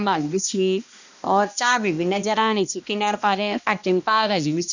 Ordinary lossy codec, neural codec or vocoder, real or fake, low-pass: none; codec, 16 kHz, 1 kbps, X-Codec, HuBERT features, trained on balanced general audio; fake; 7.2 kHz